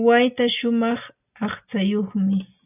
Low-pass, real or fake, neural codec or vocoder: 3.6 kHz; real; none